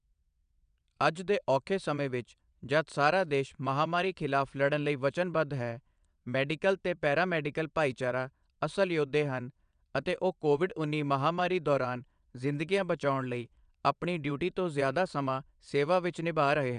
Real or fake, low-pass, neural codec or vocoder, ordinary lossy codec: fake; 9.9 kHz; vocoder, 22.05 kHz, 80 mel bands, WaveNeXt; none